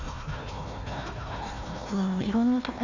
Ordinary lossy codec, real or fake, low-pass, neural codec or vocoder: none; fake; 7.2 kHz; codec, 16 kHz, 1 kbps, FunCodec, trained on Chinese and English, 50 frames a second